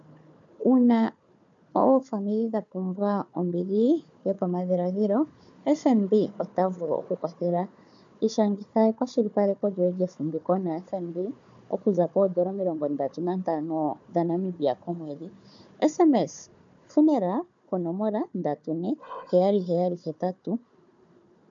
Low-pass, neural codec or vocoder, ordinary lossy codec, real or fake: 7.2 kHz; codec, 16 kHz, 4 kbps, FunCodec, trained on Chinese and English, 50 frames a second; MP3, 64 kbps; fake